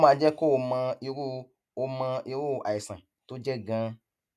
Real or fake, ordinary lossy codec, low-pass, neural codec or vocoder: real; none; none; none